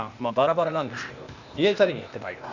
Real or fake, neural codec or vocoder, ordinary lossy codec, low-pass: fake; codec, 16 kHz, 0.8 kbps, ZipCodec; none; 7.2 kHz